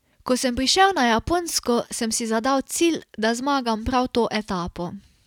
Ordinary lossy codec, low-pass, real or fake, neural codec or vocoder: none; 19.8 kHz; real; none